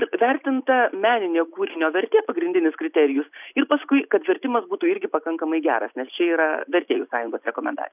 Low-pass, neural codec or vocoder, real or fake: 3.6 kHz; none; real